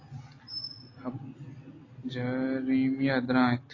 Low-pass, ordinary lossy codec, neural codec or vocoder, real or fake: 7.2 kHz; AAC, 32 kbps; none; real